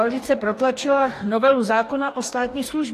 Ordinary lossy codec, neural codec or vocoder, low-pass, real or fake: AAC, 64 kbps; codec, 44.1 kHz, 2.6 kbps, DAC; 14.4 kHz; fake